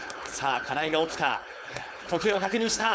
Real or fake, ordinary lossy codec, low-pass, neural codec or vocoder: fake; none; none; codec, 16 kHz, 4.8 kbps, FACodec